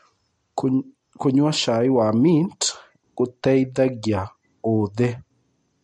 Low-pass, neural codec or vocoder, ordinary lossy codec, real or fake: 10.8 kHz; none; MP3, 48 kbps; real